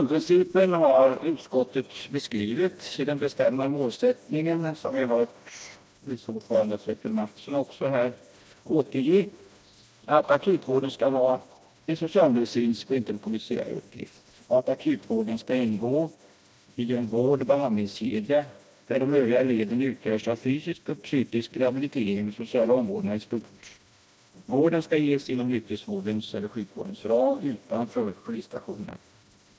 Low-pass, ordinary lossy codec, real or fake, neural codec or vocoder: none; none; fake; codec, 16 kHz, 1 kbps, FreqCodec, smaller model